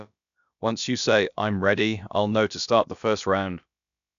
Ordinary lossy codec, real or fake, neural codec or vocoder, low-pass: none; fake; codec, 16 kHz, about 1 kbps, DyCAST, with the encoder's durations; 7.2 kHz